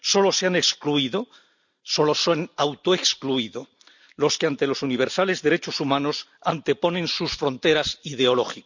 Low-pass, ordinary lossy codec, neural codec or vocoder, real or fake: 7.2 kHz; none; none; real